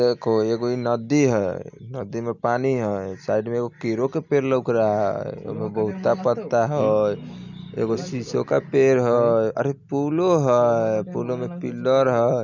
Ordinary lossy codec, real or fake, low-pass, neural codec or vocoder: none; real; 7.2 kHz; none